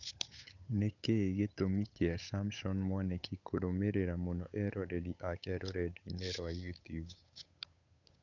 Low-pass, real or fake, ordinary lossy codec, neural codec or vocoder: 7.2 kHz; fake; none; codec, 16 kHz, 8 kbps, FunCodec, trained on Chinese and English, 25 frames a second